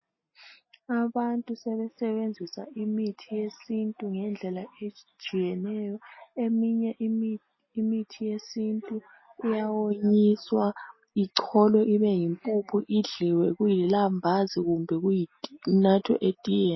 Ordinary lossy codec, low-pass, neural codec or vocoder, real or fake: MP3, 24 kbps; 7.2 kHz; none; real